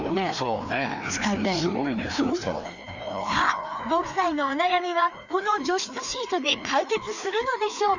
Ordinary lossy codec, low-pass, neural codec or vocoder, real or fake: none; 7.2 kHz; codec, 16 kHz, 2 kbps, FreqCodec, larger model; fake